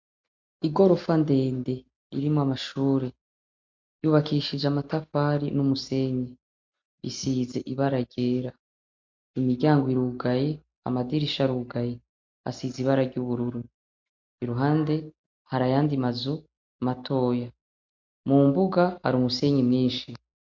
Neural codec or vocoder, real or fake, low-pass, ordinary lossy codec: none; real; 7.2 kHz; MP3, 48 kbps